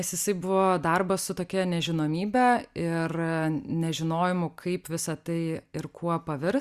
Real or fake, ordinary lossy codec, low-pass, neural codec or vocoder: real; Opus, 64 kbps; 14.4 kHz; none